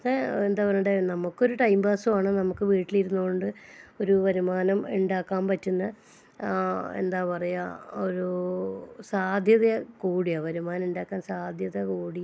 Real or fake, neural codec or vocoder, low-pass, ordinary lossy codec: real; none; none; none